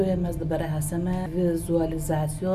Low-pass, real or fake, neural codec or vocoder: 14.4 kHz; real; none